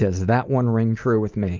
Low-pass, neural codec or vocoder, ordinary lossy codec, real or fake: 7.2 kHz; none; Opus, 24 kbps; real